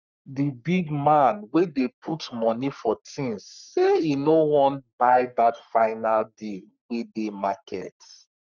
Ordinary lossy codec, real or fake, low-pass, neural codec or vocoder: none; fake; 7.2 kHz; codec, 44.1 kHz, 3.4 kbps, Pupu-Codec